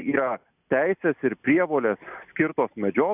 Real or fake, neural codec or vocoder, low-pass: real; none; 3.6 kHz